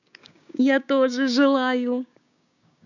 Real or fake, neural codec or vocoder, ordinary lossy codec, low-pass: fake; codec, 16 kHz, 6 kbps, DAC; none; 7.2 kHz